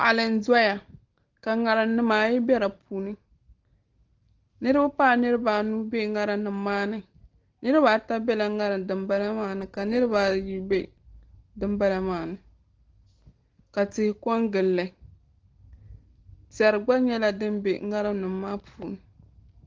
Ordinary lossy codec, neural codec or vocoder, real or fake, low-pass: Opus, 16 kbps; none; real; 7.2 kHz